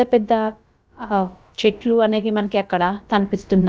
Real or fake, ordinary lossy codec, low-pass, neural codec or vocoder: fake; none; none; codec, 16 kHz, about 1 kbps, DyCAST, with the encoder's durations